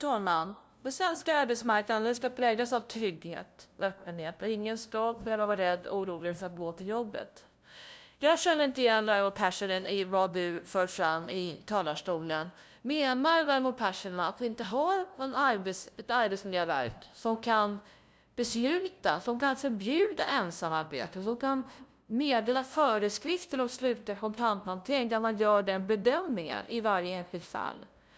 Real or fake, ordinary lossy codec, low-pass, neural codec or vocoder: fake; none; none; codec, 16 kHz, 0.5 kbps, FunCodec, trained on LibriTTS, 25 frames a second